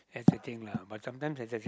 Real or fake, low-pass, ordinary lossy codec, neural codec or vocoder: real; none; none; none